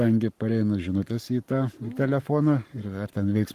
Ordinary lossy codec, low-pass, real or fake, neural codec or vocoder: Opus, 32 kbps; 14.4 kHz; fake; codec, 44.1 kHz, 7.8 kbps, Pupu-Codec